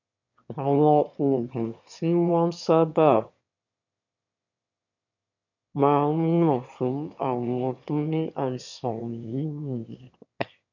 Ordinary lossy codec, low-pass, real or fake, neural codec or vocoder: none; 7.2 kHz; fake; autoencoder, 22.05 kHz, a latent of 192 numbers a frame, VITS, trained on one speaker